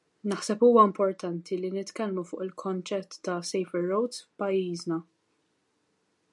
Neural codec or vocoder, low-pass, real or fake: none; 10.8 kHz; real